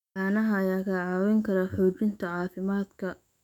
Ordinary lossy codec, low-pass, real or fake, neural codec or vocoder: none; 19.8 kHz; fake; autoencoder, 48 kHz, 128 numbers a frame, DAC-VAE, trained on Japanese speech